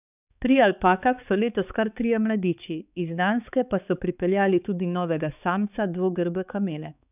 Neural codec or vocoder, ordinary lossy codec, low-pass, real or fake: codec, 16 kHz, 4 kbps, X-Codec, HuBERT features, trained on balanced general audio; none; 3.6 kHz; fake